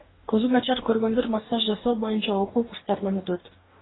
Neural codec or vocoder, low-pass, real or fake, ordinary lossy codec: codec, 44.1 kHz, 2.6 kbps, DAC; 7.2 kHz; fake; AAC, 16 kbps